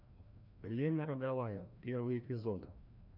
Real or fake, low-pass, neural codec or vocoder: fake; 5.4 kHz; codec, 16 kHz, 1 kbps, FreqCodec, larger model